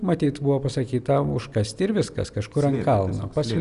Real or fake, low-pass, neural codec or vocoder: real; 10.8 kHz; none